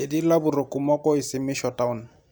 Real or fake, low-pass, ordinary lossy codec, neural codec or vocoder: real; none; none; none